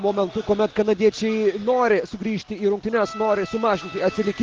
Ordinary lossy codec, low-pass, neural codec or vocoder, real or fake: Opus, 16 kbps; 7.2 kHz; none; real